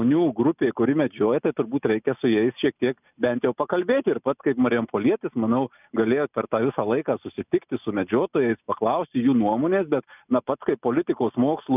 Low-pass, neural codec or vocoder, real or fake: 3.6 kHz; none; real